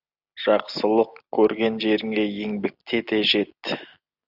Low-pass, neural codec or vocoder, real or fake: 5.4 kHz; none; real